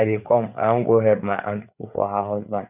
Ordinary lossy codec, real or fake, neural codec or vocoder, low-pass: none; fake; codec, 16 kHz, 4 kbps, FreqCodec, larger model; 3.6 kHz